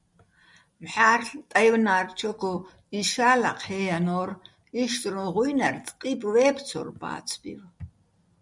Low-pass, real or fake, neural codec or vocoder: 10.8 kHz; real; none